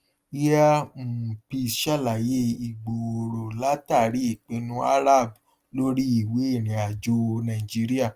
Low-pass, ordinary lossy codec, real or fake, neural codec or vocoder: 14.4 kHz; Opus, 24 kbps; real; none